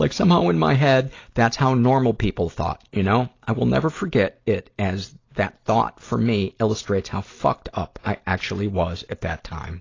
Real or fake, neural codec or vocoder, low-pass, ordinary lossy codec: real; none; 7.2 kHz; AAC, 32 kbps